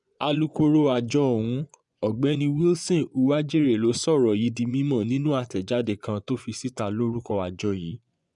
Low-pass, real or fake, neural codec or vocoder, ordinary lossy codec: 10.8 kHz; fake; vocoder, 24 kHz, 100 mel bands, Vocos; none